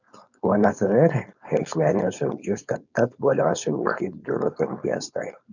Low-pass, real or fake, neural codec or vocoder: 7.2 kHz; fake; codec, 16 kHz, 4.8 kbps, FACodec